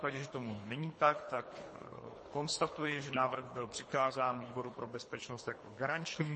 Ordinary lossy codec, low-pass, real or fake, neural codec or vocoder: MP3, 32 kbps; 10.8 kHz; fake; codec, 24 kHz, 3 kbps, HILCodec